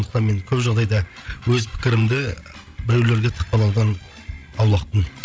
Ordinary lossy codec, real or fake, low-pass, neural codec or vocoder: none; fake; none; codec, 16 kHz, 16 kbps, FreqCodec, larger model